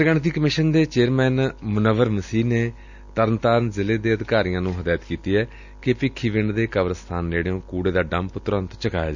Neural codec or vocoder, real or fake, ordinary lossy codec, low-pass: none; real; none; 7.2 kHz